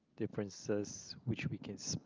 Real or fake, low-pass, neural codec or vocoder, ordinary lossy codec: real; 7.2 kHz; none; Opus, 24 kbps